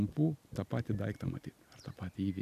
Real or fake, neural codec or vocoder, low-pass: real; none; 14.4 kHz